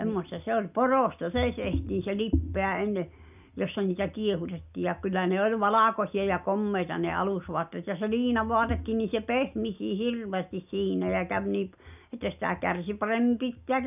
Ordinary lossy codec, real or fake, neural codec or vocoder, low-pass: none; real; none; 3.6 kHz